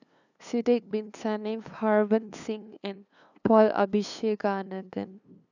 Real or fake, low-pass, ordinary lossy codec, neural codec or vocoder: fake; 7.2 kHz; none; codec, 16 kHz, 2 kbps, FunCodec, trained on LibriTTS, 25 frames a second